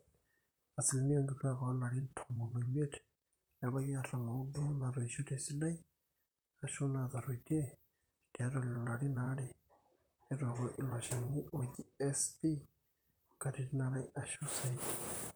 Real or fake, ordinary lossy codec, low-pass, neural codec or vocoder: fake; none; none; vocoder, 44.1 kHz, 128 mel bands, Pupu-Vocoder